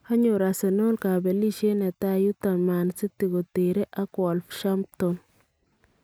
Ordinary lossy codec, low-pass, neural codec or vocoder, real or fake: none; none; none; real